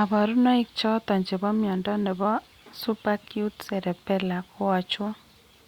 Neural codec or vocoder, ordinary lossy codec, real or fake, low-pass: none; Opus, 64 kbps; real; 19.8 kHz